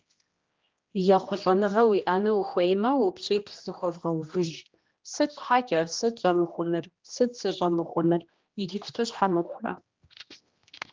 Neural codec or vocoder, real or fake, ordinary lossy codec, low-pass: codec, 16 kHz, 1 kbps, X-Codec, HuBERT features, trained on general audio; fake; Opus, 32 kbps; 7.2 kHz